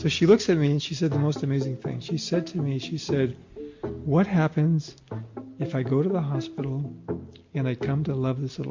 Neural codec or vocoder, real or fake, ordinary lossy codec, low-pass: none; real; MP3, 48 kbps; 7.2 kHz